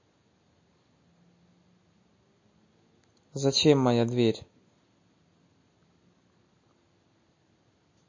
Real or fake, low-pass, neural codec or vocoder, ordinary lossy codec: real; 7.2 kHz; none; MP3, 32 kbps